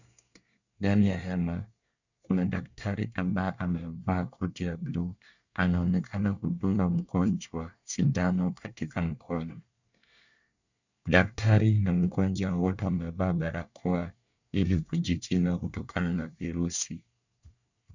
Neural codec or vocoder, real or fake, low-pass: codec, 24 kHz, 1 kbps, SNAC; fake; 7.2 kHz